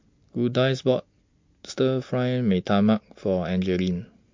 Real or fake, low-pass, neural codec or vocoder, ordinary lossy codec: real; 7.2 kHz; none; MP3, 48 kbps